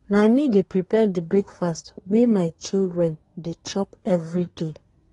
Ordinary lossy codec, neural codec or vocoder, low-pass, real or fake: AAC, 32 kbps; codec, 24 kHz, 1 kbps, SNAC; 10.8 kHz; fake